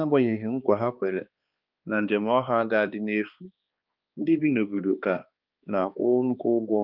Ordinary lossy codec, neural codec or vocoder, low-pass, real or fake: Opus, 24 kbps; codec, 16 kHz, 2 kbps, X-Codec, HuBERT features, trained on balanced general audio; 5.4 kHz; fake